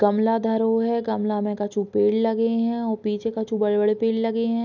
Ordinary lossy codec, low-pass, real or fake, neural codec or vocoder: none; 7.2 kHz; real; none